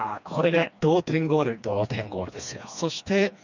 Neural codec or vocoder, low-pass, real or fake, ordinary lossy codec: codec, 16 kHz, 2 kbps, FreqCodec, smaller model; 7.2 kHz; fake; none